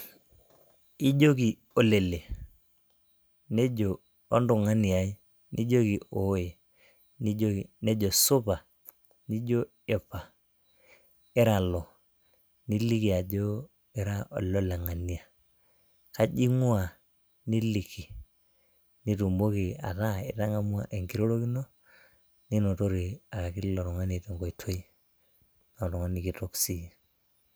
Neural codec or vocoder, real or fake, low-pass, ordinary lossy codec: none; real; none; none